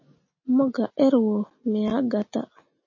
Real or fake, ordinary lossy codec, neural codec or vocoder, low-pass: real; MP3, 32 kbps; none; 7.2 kHz